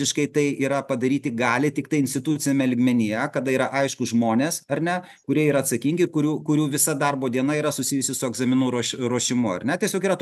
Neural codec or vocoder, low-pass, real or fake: none; 14.4 kHz; real